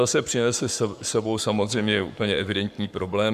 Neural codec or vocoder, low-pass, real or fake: codec, 44.1 kHz, 7.8 kbps, Pupu-Codec; 14.4 kHz; fake